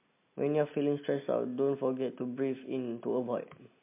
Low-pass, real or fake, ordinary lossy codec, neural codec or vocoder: 3.6 kHz; real; MP3, 24 kbps; none